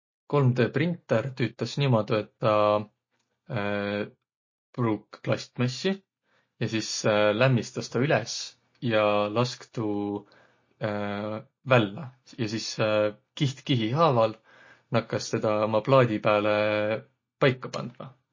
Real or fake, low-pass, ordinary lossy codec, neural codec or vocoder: real; 7.2 kHz; MP3, 32 kbps; none